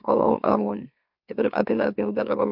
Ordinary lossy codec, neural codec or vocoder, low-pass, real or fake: MP3, 48 kbps; autoencoder, 44.1 kHz, a latent of 192 numbers a frame, MeloTTS; 5.4 kHz; fake